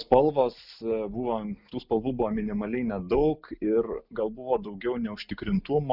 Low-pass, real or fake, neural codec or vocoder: 5.4 kHz; real; none